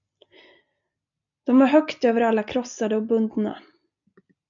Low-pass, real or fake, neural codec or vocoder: 7.2 kHz; real; none